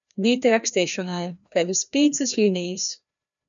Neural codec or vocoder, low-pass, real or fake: codec, 16 kHz, 1 kbps, FreqCodec, larger model; 7.2 kHz; fake